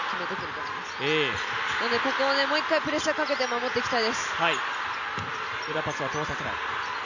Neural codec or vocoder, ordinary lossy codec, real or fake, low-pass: none; none; real; 7.2 kHz